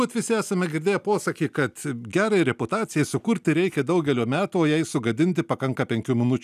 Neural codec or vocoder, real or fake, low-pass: none; real; 14.4 kHz